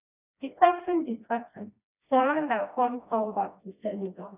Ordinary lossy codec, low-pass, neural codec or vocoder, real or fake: none; 3.6 kHz; codec, 16 kHz, 1 kbps, FreqCodec, smaller model; fake